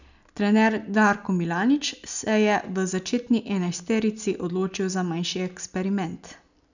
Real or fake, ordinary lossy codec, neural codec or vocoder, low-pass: real; none; none; 7.2 kHz